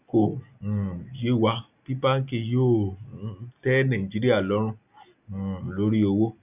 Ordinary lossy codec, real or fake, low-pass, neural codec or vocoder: none; real; 3.6 kHz; none